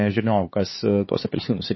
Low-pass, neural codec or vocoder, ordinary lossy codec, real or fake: 7.2 kHz; codec, 16 kHz, 4 kbps, X-Codec, HuBERT features, trained on LibriSpeech; MP3, 24 kbps; fake